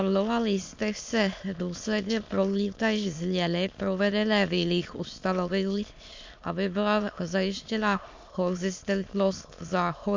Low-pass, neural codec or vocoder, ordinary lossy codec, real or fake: 7.2 kHz; autoencoder, 22.05 kHz, a latent of 192 numbers a frame, VITS, trained on many speakers; MP3, 48 kbps; fake